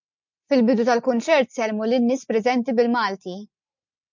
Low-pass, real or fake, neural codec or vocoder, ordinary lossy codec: 7.2 kHz; real; none; MP3, 64 kbps